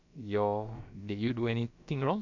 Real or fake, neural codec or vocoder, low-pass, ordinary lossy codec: fake; codec, 16 kHz, about 1 kbps, DyCAST, with the encoder's durations; 7.2 kHz; none